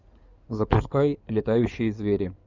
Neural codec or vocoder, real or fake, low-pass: codec, 16 kHz in and 24 kHz out, 2.2 kbps, FireRedTTS-2 codec; fake; 7.2 kHz